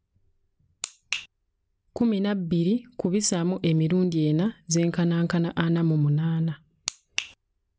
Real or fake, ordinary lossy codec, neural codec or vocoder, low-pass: real; none; none; none